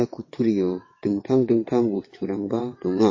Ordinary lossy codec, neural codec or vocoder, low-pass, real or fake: MP3, 32 kbps; vocoder, 22.05 kHz, 80 mel bands, WaveNeXt; 7.2 kHz; fake